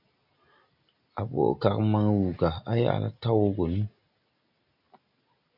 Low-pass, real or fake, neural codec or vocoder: 5.4 kHz; real; none